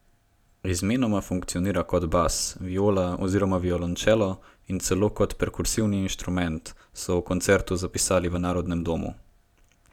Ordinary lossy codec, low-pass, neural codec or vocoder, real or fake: none; 19.8 kHz; none; real